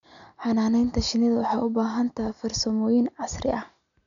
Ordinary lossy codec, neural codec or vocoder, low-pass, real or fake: none; none; 7.2 kHz; real